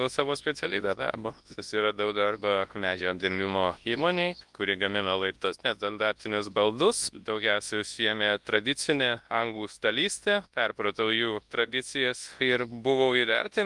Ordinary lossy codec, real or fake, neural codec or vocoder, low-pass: Opus, 32 kbps; fake; codec, 24 kHz, 0.9 kbps, WavTokenizer, large speech release; 10.8 kHz